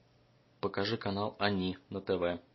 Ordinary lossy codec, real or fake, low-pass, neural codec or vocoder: MP3, 24 kbps; real; 7.2 kHz; none